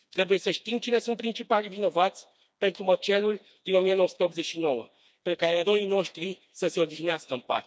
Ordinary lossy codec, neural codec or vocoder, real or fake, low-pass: none; codec, 16 kHz, 2 kbps, FreqCodec, smaller model; fake; none